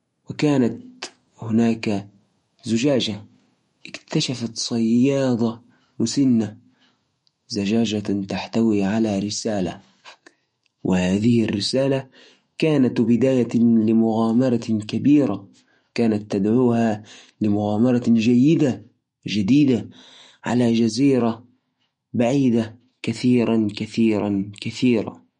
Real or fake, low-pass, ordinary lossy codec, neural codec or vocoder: fake; 19.8 kHz; MP3, 48 kbps; autoencoder, 48 kHz, 128 numbers a frame, DAC-VAE, trained on Japanese speech